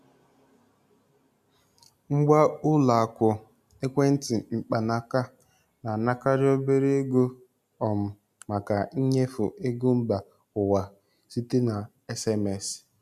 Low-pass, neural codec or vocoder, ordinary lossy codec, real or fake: 14.4 kHz; none; none; real